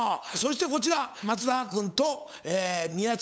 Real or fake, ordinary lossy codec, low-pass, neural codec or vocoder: fake; none; none; codec, 16 kHz, 8 kbps, FunCodec, trained on LibriTTS, 25 frames a second